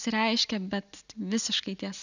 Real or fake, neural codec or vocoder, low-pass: real; none; 7.2 kHz